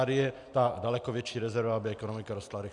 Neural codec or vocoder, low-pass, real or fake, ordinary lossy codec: none; 10.8 kHz; real; MP3, 96 kbps